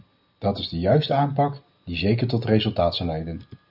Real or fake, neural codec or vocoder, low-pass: real; none; 5.4 kHz